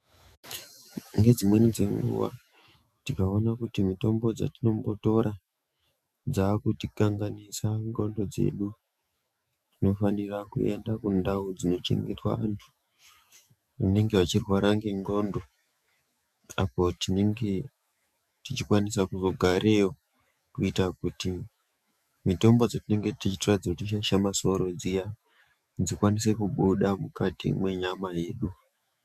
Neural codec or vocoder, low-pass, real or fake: autoencoder, 48 kHz, 128 numbers a frame, DAC-VAE, trained on Japanese speech; 14.4 kHz; fake